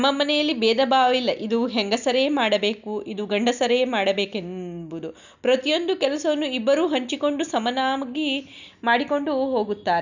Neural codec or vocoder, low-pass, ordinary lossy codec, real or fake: none; 7.2 kHz; none; real